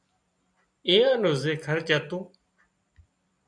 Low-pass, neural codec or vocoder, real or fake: 9.9 kHz; vocoder, 44.1 kHz, 128 mel bands every 256 samples, BigVGAN v2; fake